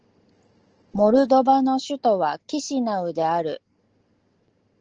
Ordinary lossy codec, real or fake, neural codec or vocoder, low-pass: Opus, 16 kbps; real; none; 7.2 kHz